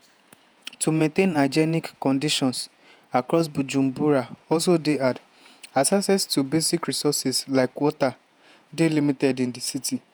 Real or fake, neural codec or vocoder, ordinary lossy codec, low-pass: fake; vocoder, 48 kHz, 128 mel bands, Vocos; none; none